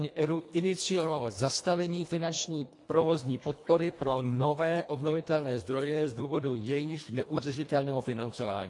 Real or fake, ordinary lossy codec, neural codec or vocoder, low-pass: fake; AAC, 48 kbps; codec, 24 kHz, 1.5 kbps, HILCodec; 10.8 kHz